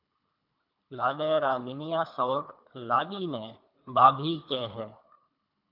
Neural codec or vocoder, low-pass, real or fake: codec, 24 kHz, 3 kbps, HILCodec; 5.4 kHz; fake